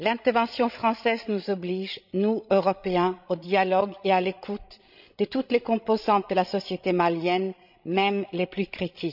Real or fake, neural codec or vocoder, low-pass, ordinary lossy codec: fake; codec, 16 kHz, 16 kbps, FreqCodec, larger model; 5.4 kHz; none